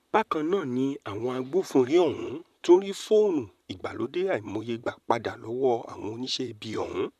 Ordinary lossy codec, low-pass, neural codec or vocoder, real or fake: none; 14.4 kHz; vocoder, 44.1 kHz, 128 mel bands, Pupu-Vocoder; fake